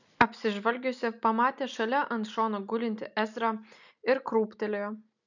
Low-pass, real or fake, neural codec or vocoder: 7.2 kHz; real; none